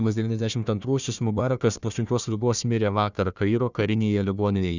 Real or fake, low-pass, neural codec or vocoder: fake; 7.2 kHz; codec, 16 kHz, 1 kbps, FunCodec, trained on Chinese and English, 50 frames a second